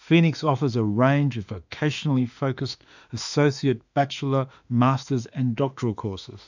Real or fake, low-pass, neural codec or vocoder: fake; 7.2 kHz; autoencoder, 48 kHz, 32 numbers a frame, DAC-VAE, trained on Japanese speech